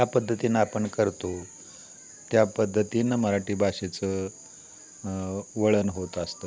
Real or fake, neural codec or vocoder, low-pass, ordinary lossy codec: real; none; none; none